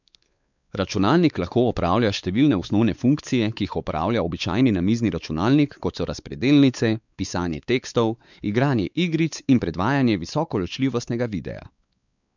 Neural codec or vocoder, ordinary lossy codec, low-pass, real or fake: codec, 16 kHz, 4 kbps, X-Codec, WavLM features, trained on Multilingual LibriSpeech; none; 7.2 kHz; fake